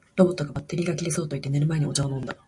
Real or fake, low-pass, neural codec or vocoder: real; 10.8 kHz; none